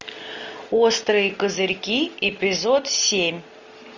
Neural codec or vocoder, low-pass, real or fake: none; 7.2 kHz; real